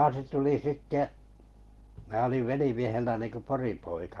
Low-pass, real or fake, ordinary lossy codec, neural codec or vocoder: 19.8 kHz; real; Opus, 16 kbps; none